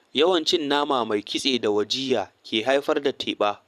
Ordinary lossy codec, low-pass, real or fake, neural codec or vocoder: none; 14.4 kHz; fake; vocoder, 44.1 kHz, 128 mel bands every 512 samples, BigVGAN v2